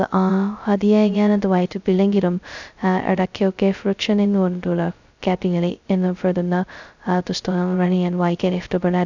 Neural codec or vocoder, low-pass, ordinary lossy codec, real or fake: codec, 16 kHz, 0.2 kbps, FocalCodec; 7.2 kHz; none; fake